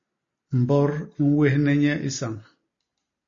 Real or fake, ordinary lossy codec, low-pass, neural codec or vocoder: real; MP3, 32 kbps; 7.2 kHz; none